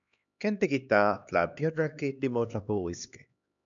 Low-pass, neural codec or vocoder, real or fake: 7.2 kHz; codec, 16 kHz, 2 kbps, X-Codec, HuBERT features, trained on LibriSpeech; fake